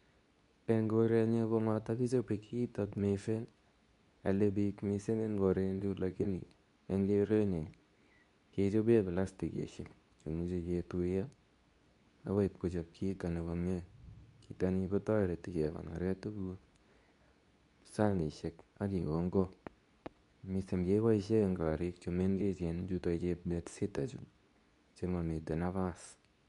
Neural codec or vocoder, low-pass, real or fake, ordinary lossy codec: codec, 24 kHz, 0.9 kbps, WavTokenizer, medium speech release version 2; 10.8 kHz; fake; none